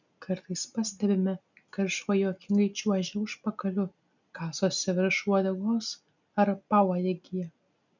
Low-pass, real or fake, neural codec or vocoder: 7.2 kHz; real; none